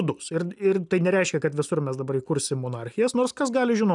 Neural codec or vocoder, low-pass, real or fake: none; 10.8 kHz; real